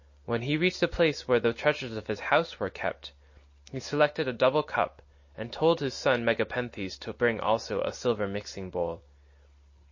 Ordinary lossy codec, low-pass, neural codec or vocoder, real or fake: MP3, 32 kbps; 7.2 kHz; none; real